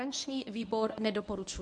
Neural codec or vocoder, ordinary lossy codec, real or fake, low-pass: codec, 24 kHz, 0.9 kbps, WavTokenizer, medium speech release version 1; MP3, 64 kbps; fake; 10.8 kHz